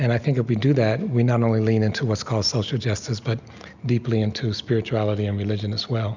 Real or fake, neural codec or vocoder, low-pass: real; none; 7.2 kHz